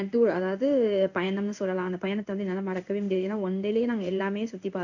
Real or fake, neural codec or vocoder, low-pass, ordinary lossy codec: fake; codec, 16 kHz in and 24 kHz out, 1 kbps, XY-Tokenizer; 7.2 kHz; none